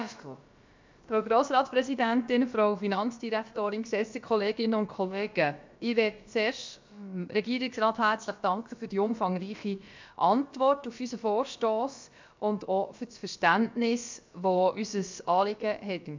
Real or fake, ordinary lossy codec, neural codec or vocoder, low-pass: fake; MP3, 64 kbps; codec, 16 kHz, about 1 kbps, DyCAST, with the encoder's durations; 7.2 kHz